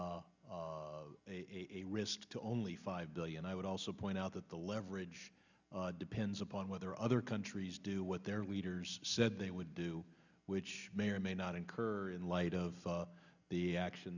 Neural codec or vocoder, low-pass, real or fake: none; 7.2 kHz; real